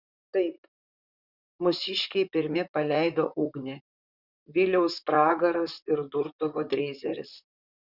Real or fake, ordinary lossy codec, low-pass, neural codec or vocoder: fake; Opus, 64 kbps; 5.4 kHz; vocoder, 44.1 kHz, 128 mel bands, Pupu-Vocoder